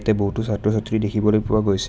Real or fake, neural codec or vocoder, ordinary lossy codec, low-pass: real; none; none; none